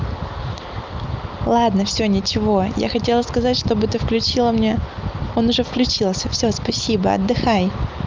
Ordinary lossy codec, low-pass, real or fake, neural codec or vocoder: Opus, 32 kbps; 7.2 kHz; real; none